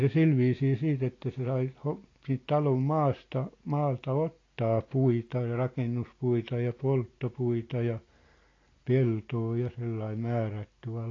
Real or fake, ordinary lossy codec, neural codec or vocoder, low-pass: real; AAC, 32 kbps; none; 7.2 kHz